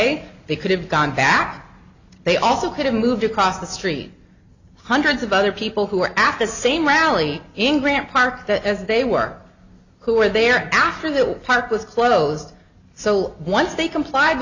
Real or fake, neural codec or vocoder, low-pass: real; none; 7.2 kHz